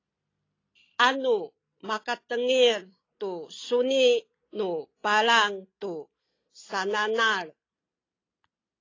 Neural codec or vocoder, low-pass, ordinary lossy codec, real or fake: none; 7.2 kHz; AAC, 32 kbps; real